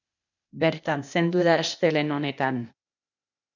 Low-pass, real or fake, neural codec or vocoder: 7.2 kHz; fake; codec, 16 kHz, 0.8 kbps, ZipCodec